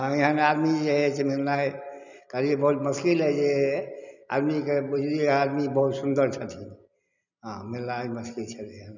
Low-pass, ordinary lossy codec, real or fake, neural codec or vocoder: 7.2 kHz; none; real; none